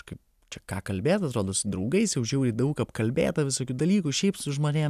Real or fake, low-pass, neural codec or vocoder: real; 14.4 kHz; none